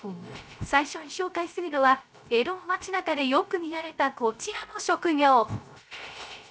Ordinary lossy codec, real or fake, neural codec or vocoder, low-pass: none; fake; codec, 16 kHz, 0.3 kbps, FocalCodec; none